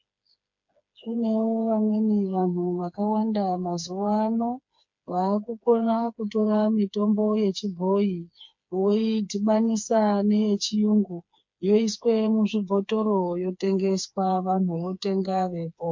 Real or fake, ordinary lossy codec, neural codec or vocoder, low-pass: fake; MP3, 48 kbps; codec, 16 kHz, 4 kbps, FreqCodec, smaller model; 7.2 kHz